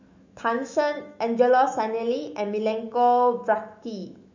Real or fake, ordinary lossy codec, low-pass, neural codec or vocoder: fake; MP3, 64 kbps; 7.2 kHz; autoencoder, 48 kHz, 128 numbers a frame, DAC-VAE, trained on Japanese speech